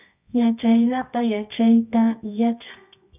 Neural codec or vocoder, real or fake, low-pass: codec, 24 kHz, 0.9 kbps, WavTokenizer, medium music audio release; fake; 3.6 kHz